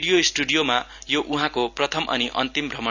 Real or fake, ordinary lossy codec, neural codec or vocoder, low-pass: real; none; none; 7.2 kHz